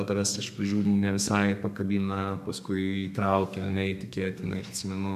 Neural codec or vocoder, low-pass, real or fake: codec, 32 kHz, 1.9 kbps, SNAC; 14.4 kHz; fake